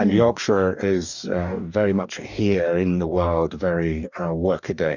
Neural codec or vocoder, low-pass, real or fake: codec, 44.1 kHz, 2.6 kbps, DAC; 7.2 kHz; fake